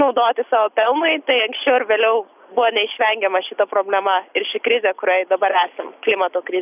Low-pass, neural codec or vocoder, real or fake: 3.6 kHz; none; real